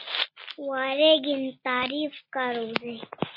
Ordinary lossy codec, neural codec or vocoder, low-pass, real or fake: MP3, 24 kbps; none; 5.4 kHz; real